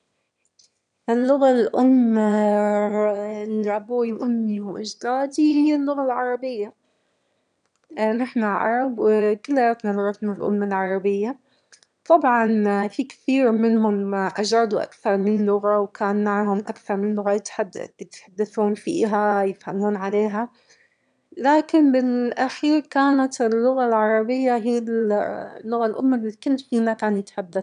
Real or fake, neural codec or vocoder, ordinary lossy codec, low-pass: fake; autoencoder, 22.05 kHz, a latent of 192 numbers a frame, VITS, trained on one speaker; none; 9.9 kHz